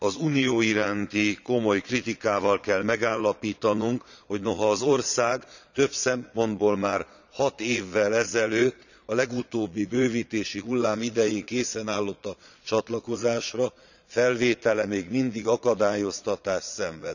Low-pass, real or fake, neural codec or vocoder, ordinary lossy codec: 7.2 kHz; fake; vocoder, 22.05 kHz, 80 mel bands, Vocos; none